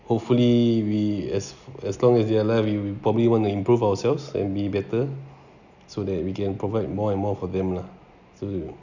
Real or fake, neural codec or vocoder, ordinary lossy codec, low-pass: real; none; none; 7.2 kHz